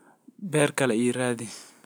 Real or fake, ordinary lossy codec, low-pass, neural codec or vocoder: real; none; none; none